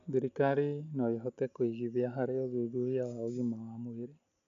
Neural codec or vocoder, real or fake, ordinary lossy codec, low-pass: none; real; none; 7.2 kHz